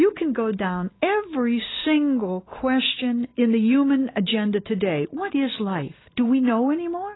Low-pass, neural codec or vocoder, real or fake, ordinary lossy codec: 7.2 kHz; none; real; AAC, 16 kbps